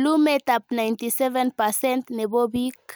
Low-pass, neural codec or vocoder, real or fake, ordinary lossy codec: none; none; real; none